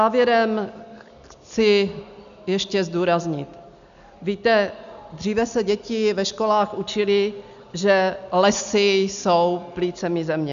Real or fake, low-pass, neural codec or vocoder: real; 7.2 kHz; none